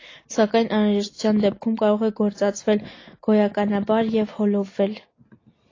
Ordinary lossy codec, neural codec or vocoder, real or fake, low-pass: AAC, 32 kbps; none; real; 7.2 kHz